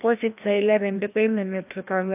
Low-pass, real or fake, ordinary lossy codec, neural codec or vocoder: 3.6 kHz; fake; AAC, 32 kbps; codec, 16 kHz, 1 kbps, FreqCodec, larger model